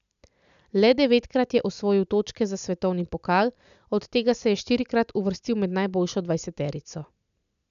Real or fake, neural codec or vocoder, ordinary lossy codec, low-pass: real; none; none; 7.2 kHz